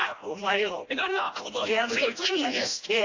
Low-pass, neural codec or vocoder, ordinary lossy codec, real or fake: 7.2 kHz; codec, 16 kHz, 1 kbps, FreqCodec, smaller model; AAC, 48 kbps; fake